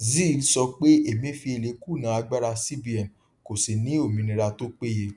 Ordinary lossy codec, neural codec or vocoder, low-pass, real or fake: none; none; 10.8 kHz; real